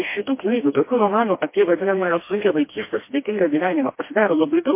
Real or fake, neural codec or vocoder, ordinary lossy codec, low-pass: fake; codec, 16 kHz, 1 kbps, FreqCodec, smaller model; MP3, 24 kbps; 3.6 kHz